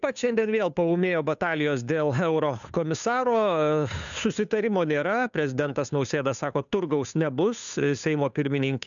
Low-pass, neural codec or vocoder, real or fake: 7.2 kHz; codec, 16 kHz, 2 kbps, FunCodec, trained on Chinese and English, 25 frames a second; fake